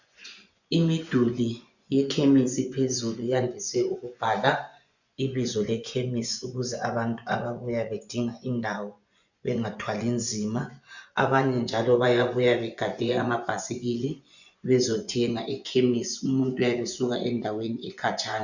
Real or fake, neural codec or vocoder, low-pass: fake; vocoder, 24 kHz, 100 mel bands, Vocos; 7.2 kHz